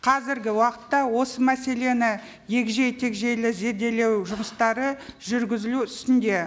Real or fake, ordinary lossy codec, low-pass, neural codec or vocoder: real; none; none; none